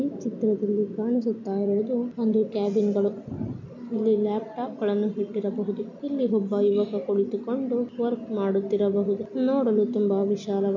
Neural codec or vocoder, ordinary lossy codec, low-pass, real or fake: none; none; 7.2 kHz; real